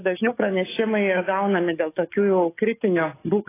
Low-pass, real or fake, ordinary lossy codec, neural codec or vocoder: 3.6 kHz; fake; AAC, 16 kbps; codec, 44.1 kHz, 7.8 kbps, DAC